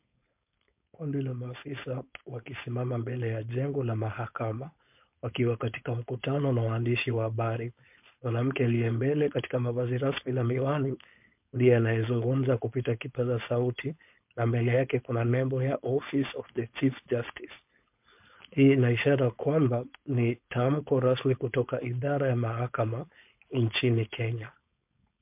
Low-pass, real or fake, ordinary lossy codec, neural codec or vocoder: 3.6 kHz; fake; MP3, 32 kbps; codec, 16 kHz, 4.8 kbps, FACodec